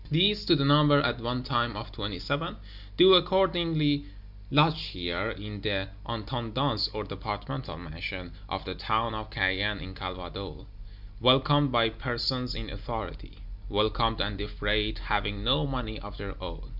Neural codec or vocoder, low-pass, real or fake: none; 5.4 kHz; real